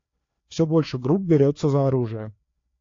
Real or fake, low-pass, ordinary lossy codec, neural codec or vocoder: fake; 7.2 kHz; AAC, 48 kbps; codec, 16 kHz, 2 kbps, FreqCodec, larger model